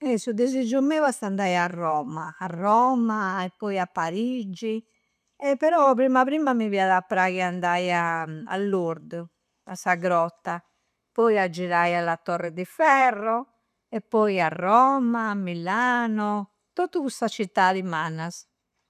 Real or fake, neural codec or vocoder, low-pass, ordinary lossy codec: real; none; 14.4 kHz; none